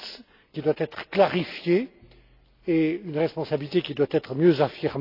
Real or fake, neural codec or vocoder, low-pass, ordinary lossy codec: real; none; 5.4 kHz; AAC, 32 kbps